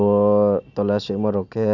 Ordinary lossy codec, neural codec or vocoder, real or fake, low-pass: none; none; real; 7.2 kHz